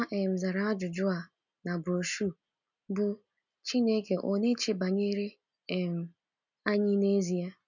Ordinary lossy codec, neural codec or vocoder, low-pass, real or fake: none; none; 7.2 kHz; real